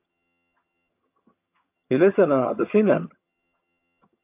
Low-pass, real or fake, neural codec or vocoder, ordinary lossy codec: 3.6 kHz; fake; vocoder, 22.05 kHz, 80 mel bands, HiFi-GAN; AAC, 32 kbps